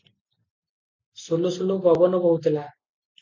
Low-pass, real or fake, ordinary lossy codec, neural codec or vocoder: 7.2 kHz; real; MP3, 48 kbps; none